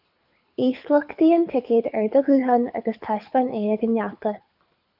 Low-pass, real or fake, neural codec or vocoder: 5.4 kHz; fake; codec, 24 kHz, 6 kbps, HILCodec